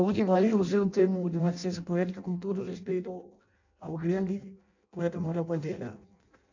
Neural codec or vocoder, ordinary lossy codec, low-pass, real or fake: codec, 16 kHz in and 24 kHz out, 0.6 kbps, FireRedTTS-2 codec; none; 7.2 kHz; fake